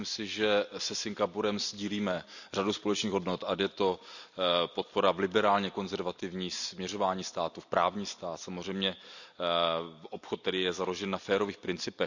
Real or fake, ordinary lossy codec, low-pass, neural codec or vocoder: real; none; 7.2 kHz; none